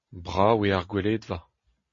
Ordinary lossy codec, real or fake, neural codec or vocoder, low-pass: MP3, 32 kbps; real; none; 7.2 kHz